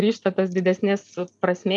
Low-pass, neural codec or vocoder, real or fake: 10.8 kHz; none; real